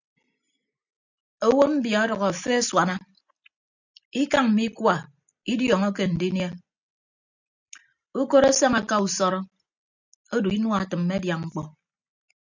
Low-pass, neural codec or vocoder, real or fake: 7.2 kHz; none; real